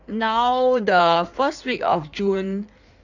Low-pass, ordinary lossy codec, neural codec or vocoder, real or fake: 7.2 kHz; none; codec, 16 kHz in and 24 kHz out, 1.1 kbps, FireRedTTS-2 codec; fake